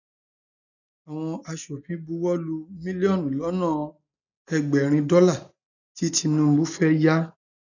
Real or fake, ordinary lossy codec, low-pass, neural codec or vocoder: real; none; 7.2 kHz; none